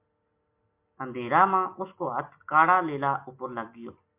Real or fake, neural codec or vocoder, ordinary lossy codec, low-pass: real; none; MP3, 32 kbps; 3.6 kHz